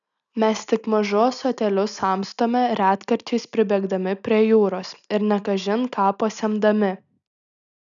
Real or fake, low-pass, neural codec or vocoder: real; 7.2 kHz; none